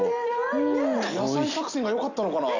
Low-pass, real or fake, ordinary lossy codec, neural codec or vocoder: 7.2 kHz; real; none; none